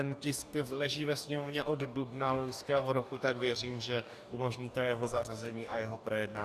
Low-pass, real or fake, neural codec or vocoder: 14.4 kHz; fake; codec, 44.1 kHz, 2.6 kbps, DAC